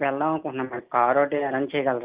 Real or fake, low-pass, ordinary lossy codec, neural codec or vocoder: real; 3.6 kHz; Opus, 32 kbps; none